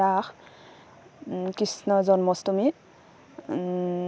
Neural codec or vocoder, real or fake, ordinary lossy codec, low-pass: none; real; none; none